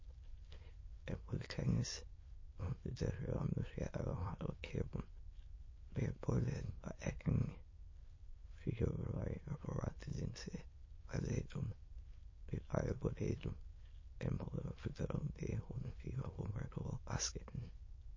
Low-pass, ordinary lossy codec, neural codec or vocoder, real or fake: 7.2 kHz; MP3, 32 kbps; autoencoder, 22.05 kHz, a latent of 192 numbers a frame, VITS, trained on many speakers; fake